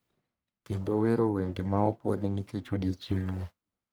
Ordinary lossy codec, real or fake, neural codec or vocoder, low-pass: none; fake; codec, 44.1 kHz, 1.7 kbps, Pupu-Codec; none